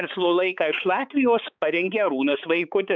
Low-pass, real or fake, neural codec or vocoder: 7.2 kHz; fake; codec, 16 kHz, 4 kbps, X-Codec, HuBERT features, trained on balanced general audio